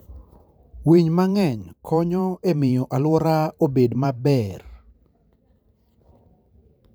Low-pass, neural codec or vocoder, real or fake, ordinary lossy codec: none; vocoder, 44.1 kHz, 128 mel bands every 512 samples, BigVGAN v2; fake; none